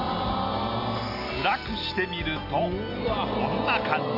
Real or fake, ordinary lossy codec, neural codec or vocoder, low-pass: real; AAC, 32 kbps; none; 5.4 kHz